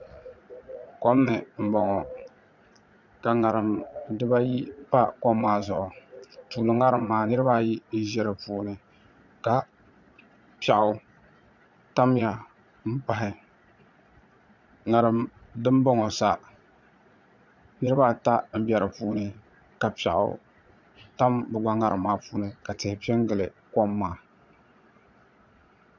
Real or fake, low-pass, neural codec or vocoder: fake; 7.2 kHz; vocoder, 22.05 kHz, 80 mel bands, Vocos